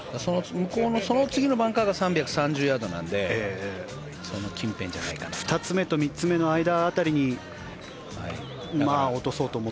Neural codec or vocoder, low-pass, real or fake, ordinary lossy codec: none; none; real; none